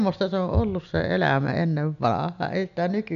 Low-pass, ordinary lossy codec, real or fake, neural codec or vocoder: 7.2 kHz; none; real; none